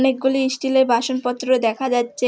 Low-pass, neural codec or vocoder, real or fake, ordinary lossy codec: none; none; real; none